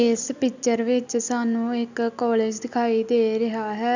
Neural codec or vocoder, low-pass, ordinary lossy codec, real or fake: none; 7.2 kHz; none; real